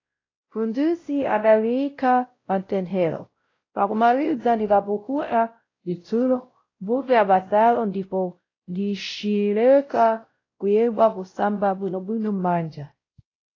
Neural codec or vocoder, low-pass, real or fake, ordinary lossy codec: codec, 16 kHz, 0.5 kbps, X-Codec, WavLM features, trained on Multilingual LibriSpeech; 7.2 kHz; fake; AAC, 32 kbps